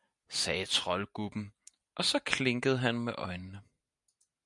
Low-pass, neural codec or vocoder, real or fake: 10.8 kHz; none; real